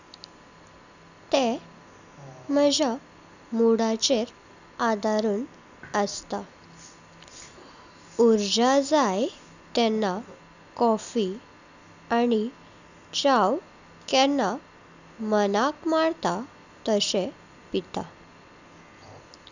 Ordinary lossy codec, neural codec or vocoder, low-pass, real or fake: none; none; 7.2 kHz; real